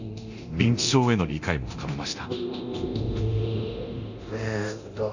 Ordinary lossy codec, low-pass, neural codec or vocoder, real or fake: none; 7.2 kHz; codec, 24 kHz, 0.9 kbps, DualCodec; fake